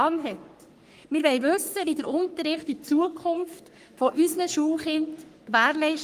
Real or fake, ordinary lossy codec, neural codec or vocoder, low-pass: fake; Opus, 32 kbps; codec, 44.1 kHz, 3.4 kbps, Pupu-Codec; 14.4 kHz